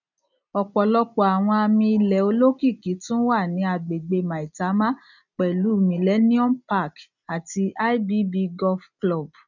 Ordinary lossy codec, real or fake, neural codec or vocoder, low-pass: none; real; none; 7.2 kHz